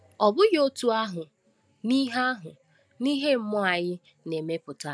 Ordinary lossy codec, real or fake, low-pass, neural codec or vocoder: none; real; none; none